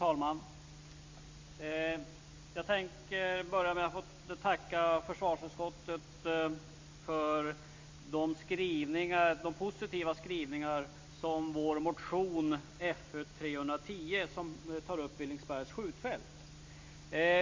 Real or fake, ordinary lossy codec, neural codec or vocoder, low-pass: real; MP3, 48 kbps; none; 7.2 kHz